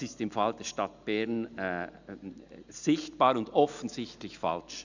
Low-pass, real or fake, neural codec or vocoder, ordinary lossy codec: 7.2 kHz; real; none; none